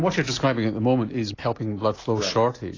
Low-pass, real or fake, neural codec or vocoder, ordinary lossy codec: 7.2 kHz; real; none; AAC, 32 kbps